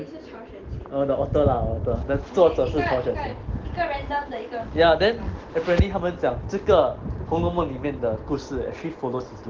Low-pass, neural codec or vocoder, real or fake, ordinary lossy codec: 7.2 kHz; none; real; Opus, 16 kbps